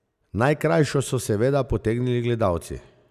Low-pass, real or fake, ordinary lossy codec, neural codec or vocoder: 14.4 kHz; real; none; none